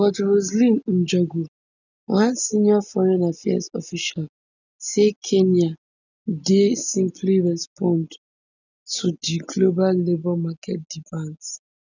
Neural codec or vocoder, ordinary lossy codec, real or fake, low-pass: none; none; real; 7.2 kHz